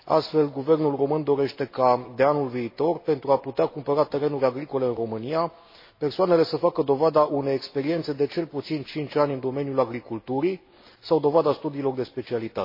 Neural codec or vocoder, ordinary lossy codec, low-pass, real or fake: none; MP3, 24 kbps; 5.4 kHz; real